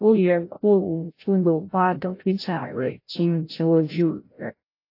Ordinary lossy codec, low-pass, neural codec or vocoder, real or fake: AAC, 32 kbps; 5.4 kHz; codec, 16 kHz, 0.5 kbps, FreqCodec, larger model; fake